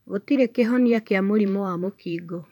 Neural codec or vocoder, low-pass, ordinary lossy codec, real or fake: vocoder, 44.1 kHz, 128 mel bands every 512 samples, BigVGAN v2; 19.8 kHz; none; fake